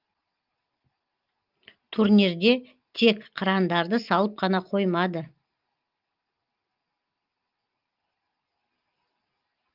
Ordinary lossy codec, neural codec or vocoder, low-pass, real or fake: Opus, 32 kbps; none; 5.4 kHz; real